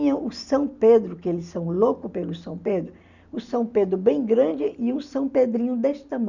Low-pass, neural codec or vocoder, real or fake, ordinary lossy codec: 7.2 kHz; none; real; none